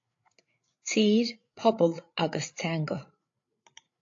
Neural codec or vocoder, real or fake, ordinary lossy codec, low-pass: codec, 16 kHz, 8 kbps, FreqCodec, larger model; fake; AAC, 48 kbps; 7.2 kHz